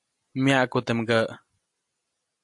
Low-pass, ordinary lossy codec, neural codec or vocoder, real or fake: 10.8 kHz; AAC, 64 kbps; none; real